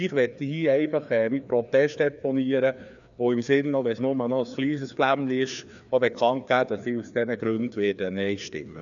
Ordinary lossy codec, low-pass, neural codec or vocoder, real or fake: none; 7.2 kHz; codec, 16 kHz, 2 kbps, FreqCodec, larger model; fake